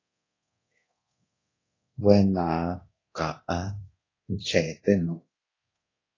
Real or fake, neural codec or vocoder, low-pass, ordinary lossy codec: fake; codec, 24 kHz, 0.9 kbps, DualCodec; 7.2 kHz; AAC, 32 kbps